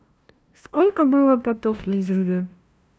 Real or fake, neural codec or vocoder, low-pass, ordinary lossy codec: fake; codec, 16 kHz, 0.5 kbps, FunCodec, trained on LibriTTS, 25 frames a second; none; none